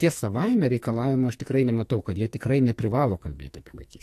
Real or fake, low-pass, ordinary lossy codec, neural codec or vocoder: fake; 14.4 kHz; AAC, 64 kbps; codec, 44.1 kHz, 2.6 kbps, SNAC